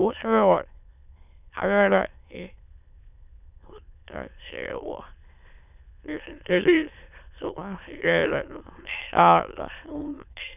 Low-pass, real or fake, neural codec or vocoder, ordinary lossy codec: 3.6 kHz; fake; autoencoder, 22.05 kHz, a latent of 192 numbers a frame, VITS, trained on many speakers; none